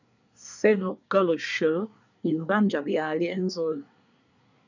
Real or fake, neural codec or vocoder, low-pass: fake; codec, 24 kHz, 1 kbps, SNAC; 7.2 kHz